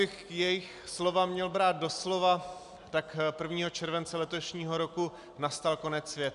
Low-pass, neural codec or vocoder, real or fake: 10.8 kHz; none; real